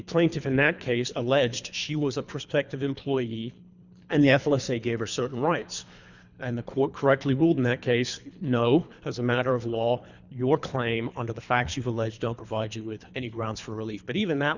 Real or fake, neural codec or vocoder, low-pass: fake; codec, 24 kHz, 3 kbps, HILCodec; 7.2 kHz